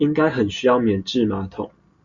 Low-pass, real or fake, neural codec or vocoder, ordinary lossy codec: 7.2 kHz; real; none; Opus, 64 kbps